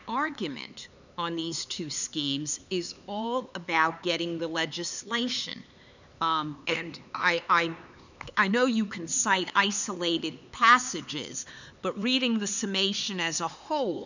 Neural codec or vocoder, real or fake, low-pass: codec, 16 kHz, 4 kbps, X-Codec, HuBERT features, trained on LibriSpeech; fake; 7.2 kHz